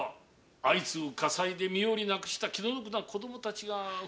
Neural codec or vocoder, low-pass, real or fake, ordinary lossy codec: none; none; real; none